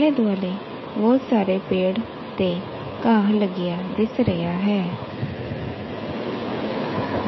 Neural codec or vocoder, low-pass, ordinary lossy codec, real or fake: codec, 16 kHz, 16 kbps, FreqCodec, smaller model; 7.2 kHz; MP3, 24 kbps; fake